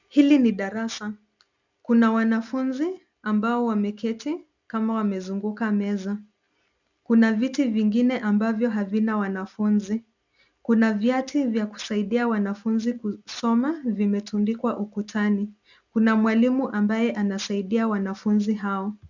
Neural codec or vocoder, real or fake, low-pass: none; real; 7.2 kHz